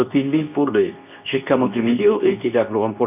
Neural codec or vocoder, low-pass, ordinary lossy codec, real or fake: codec, 24 kHz, 0.9 kbps, WavTokenizer, medium speech release version 2; 3.6 kHz; none; fake